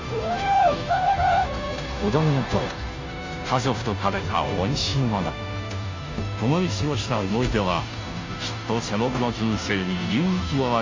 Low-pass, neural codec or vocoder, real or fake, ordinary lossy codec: 7.2 kHz; codec, 16 kHz, 0.5 kbps, FunCodec, trained on Chinese and English, 25 frames a second; fake; MP3, 48 kbps